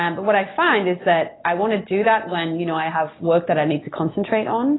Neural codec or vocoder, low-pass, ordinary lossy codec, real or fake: none; 7.2 kHz; AAC, 16 kbps; real